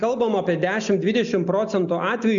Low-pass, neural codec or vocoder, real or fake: 7.2 kHz; none; real